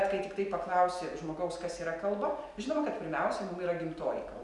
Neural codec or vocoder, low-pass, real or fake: none; 10.8 kHz; real